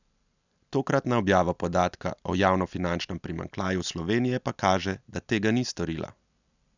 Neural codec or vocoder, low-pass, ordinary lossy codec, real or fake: none; 7.2 kHz; none; real